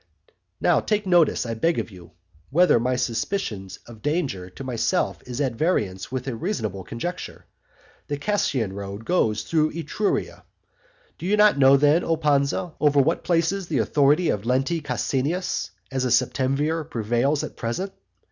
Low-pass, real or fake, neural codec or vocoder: 7.2 kHz; real; none